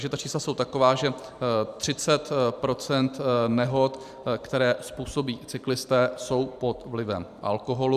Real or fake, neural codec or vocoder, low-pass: fake; vocoder, 44.1 kHz, 128 mel bands every 512 samples, BigVGAN v2; 14.4 kHz